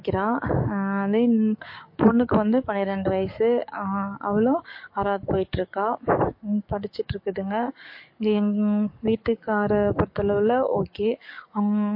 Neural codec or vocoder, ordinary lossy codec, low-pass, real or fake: codec, 44.1 kHz, 7.8 kbps, DAC; MP3, 32 kbps; 5.4 kHz; fake